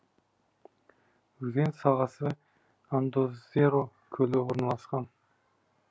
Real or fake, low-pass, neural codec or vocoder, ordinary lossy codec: real; none; none; none